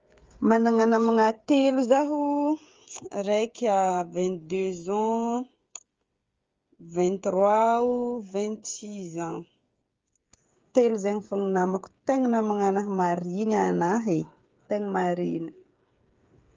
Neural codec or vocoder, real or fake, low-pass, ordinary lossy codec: codec, 16 kHz, 8 kbps, FreqCodec, smaller model; fake; 7.2 kHz; Opus, 32 kbps